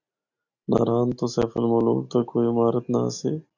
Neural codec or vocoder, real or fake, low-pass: none; real; 7.2 kHz